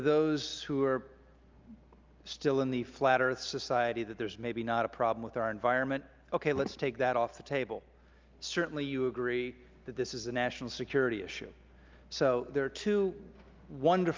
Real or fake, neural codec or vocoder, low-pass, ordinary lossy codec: real; none; 7.2 kHz; Opus, 24 kbps